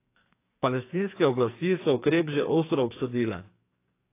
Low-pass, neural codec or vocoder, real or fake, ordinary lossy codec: 3.6 kHz; codec, 44.1 kHz, 2.6 kbps, SNAC; fake; AAC, 24 kbps